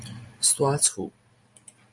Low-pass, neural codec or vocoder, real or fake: 10.8 kHz; none; real